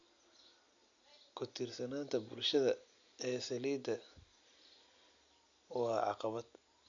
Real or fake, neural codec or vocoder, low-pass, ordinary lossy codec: real; none; 7.2 kHz; MP3, 64 kbps